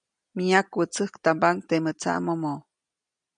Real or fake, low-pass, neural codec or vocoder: real; 9.9 kHz; none